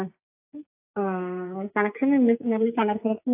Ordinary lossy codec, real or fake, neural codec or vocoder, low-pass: AAC, 32 kbps; fake; codec, 44.1 kHz, 2.6 kbps, SNAC; 3.6 kHz